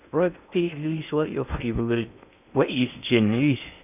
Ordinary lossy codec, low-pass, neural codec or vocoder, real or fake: none; 3.6 kHz; codec, 16 kHz in and 24 kHz out, 0.6 kbps, FocalCodec, streaming, 2048 codes; fake